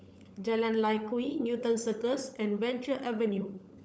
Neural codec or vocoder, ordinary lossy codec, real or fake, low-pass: codec, 16 kHz, 16 kbps, FunCodec, trained on LibriTTS, 50 frames a second; none; fake; none